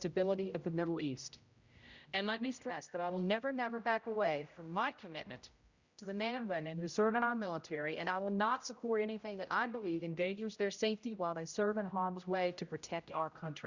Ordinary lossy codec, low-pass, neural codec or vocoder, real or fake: Opus, 64 kbps; 7.2 kHz; codec, 16 kHz, 0.5 kbps, X-Codec, HuBERT features, trained on general audio; fake